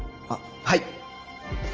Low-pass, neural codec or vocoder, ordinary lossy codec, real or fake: 7.2 kHz; none; Opus, 24 kbps; real